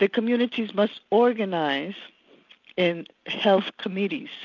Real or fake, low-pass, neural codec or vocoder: real; 7.2 kHz; none